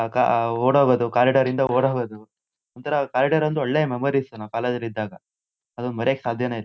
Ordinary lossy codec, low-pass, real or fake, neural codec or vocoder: none; none; real; none